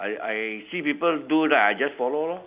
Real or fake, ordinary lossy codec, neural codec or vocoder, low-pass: real; Opus, 64 kbps; none; 3.6 kHz